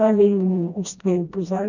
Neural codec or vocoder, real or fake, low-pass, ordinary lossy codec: codec, 16 kHz, 1 kbps, FreqCodec, smaller model; fake; 7.2 kHz; none